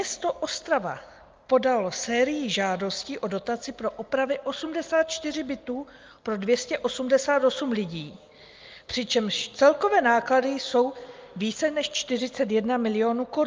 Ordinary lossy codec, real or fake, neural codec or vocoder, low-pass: Opus, 24 kbps; real; none; 7.2 kHz